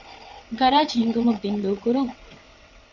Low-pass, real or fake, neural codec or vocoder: 7.2 kHz; fake; vocoder, 22.05 kHz, 80 mel bands, WaveNeXt